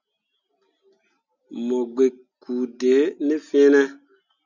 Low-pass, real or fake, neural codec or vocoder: 7.2 kHz; real; none